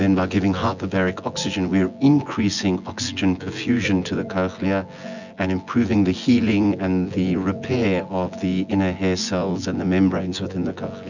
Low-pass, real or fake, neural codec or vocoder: 7.2 kHz; fake; vocoder, 24 kHz, 100 mel bands, Vocos